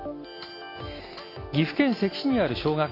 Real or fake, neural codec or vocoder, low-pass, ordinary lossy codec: real; none; 5.4 kHz; AAC, 24 kbps